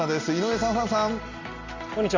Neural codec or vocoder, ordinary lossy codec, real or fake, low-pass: none; Opus, 64 kbps; real; 7.2 kHz